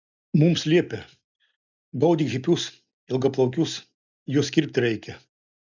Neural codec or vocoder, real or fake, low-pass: none; real; 7.2 kHz